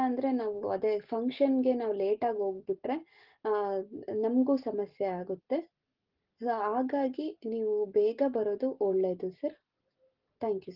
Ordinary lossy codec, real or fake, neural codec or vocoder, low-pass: Opus, 16 kbps; real; none; 5.4 kHz